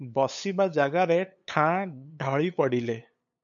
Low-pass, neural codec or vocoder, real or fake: 7.2 kHz; codec, 16 kHz, 8 kbps, FunCodec, trained on LibriTTS, 25 frames a second; fake